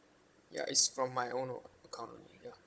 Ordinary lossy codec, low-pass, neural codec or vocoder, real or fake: none; none; codec, 16 kHz, 16 kbps, FunCodec, trained on Chinese and English, 50 frames a second; fake